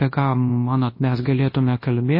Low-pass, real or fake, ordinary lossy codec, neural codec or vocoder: 5.4 kHz; fake; MP3, 24 kbps; codec, 24 kHz, 0.9 kbps, WavTokenizer, large speech release